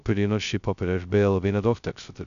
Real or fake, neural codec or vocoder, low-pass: fake; codec, 16 kHz, 0.2 kbps, FocalCodec; 7.2 kHz